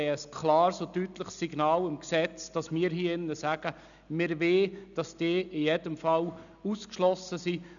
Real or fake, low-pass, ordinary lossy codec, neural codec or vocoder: real; 7.2 kHz; none; none